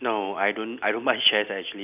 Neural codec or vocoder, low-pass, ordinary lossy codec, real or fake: none; 3.6 kHz; none; real